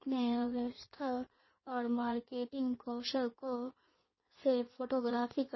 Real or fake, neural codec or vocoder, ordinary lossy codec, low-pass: fake; codec, 16 kHz in and 24 kHz out, 1.1 kbps, FireRedTTS-2 codec; MP3, 24 kbps; 7.2 kHz